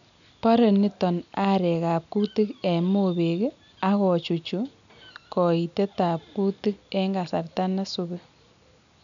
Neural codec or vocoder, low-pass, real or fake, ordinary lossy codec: none; 7.2 kHz; real; none